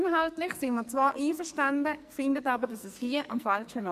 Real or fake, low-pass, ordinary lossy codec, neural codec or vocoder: fake; 14.4 kHz; MP3, 96 kbps; codec, 44.1 kHz, 2.6 kbps, SNAC